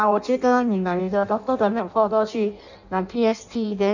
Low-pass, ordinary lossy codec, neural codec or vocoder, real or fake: 7.2 kHz; none; codec, 16 kHz in and 24 kHz out, 0.6 kbps, FireRedTTS-2 codec; fake